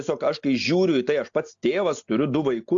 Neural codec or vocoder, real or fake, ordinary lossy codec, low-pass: none; real; AAC, 64 kbps; 7.2 kHz